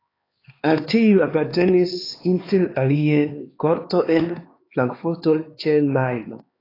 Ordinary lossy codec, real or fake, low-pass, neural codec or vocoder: AAC, 24 kbps; fake; 5.4 kHz; codec, 16 kHz, 2 kbps, X-Codec, HuBERT features, trained on LibriSpeech